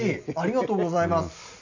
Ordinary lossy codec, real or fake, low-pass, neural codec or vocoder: none; real; 7.2 kHz; none